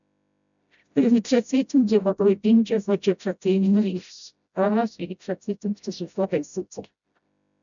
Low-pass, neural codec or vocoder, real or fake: 7.2 kHz; codec, 16 kHz, 0.5 kbps, FreqCodec, smaller model; fake